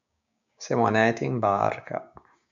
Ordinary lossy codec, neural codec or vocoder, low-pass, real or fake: MP3, 96 kbps; codec, 16 kHz, 6 kbps, DAC; 7.2 kHz; fake